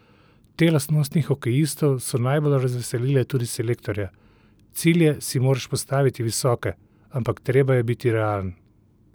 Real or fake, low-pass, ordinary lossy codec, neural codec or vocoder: real; none; none; none